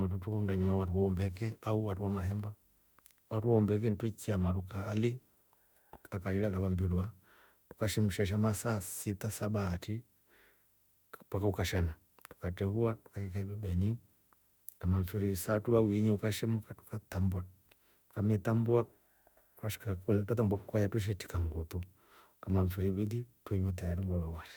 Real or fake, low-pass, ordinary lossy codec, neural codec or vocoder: fake; none; none; autoencoder, 48 kHz, 32 numbers a frame, DAC-VAE, trained on Japanese speech